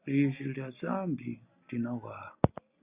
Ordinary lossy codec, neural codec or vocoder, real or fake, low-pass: Opus, 64 kbps; none; real; 3.6 kHz